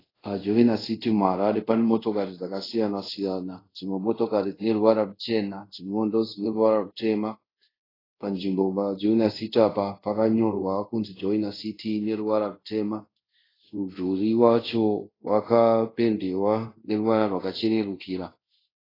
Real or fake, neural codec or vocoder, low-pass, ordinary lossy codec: fake; codec, 24 kHz, 0.5 kbps, DualCodec; 5.4 kHz; AAC, 24 kbps